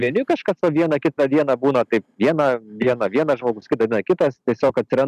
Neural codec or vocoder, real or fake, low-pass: none; real; 14.4 kHz